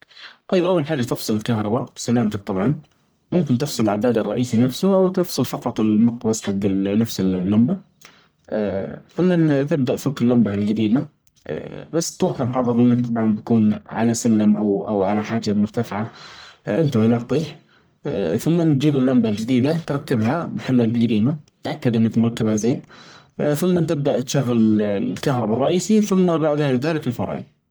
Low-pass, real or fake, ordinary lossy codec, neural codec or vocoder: none; fake; none; codec, 44.1 kHz, 1.7 kbps, Pupu-Codec